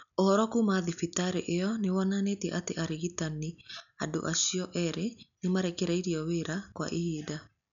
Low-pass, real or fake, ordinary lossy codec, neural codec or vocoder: 7.2 kHz; real; none; none